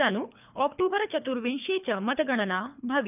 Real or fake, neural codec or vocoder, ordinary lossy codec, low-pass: fake; codec, 24 kHz, 3 kbps, HILCodec; none; 3.6 kHz